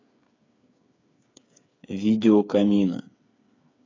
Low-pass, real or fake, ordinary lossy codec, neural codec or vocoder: 7.2 kHz; fake; AAC, 32 kbps; codec, 16 kHz, 8 kbps, FreqCodec, smaller model